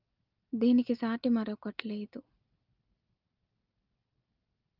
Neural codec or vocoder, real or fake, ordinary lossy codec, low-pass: none; real; Opus, 24 kbps; 5.4 kHz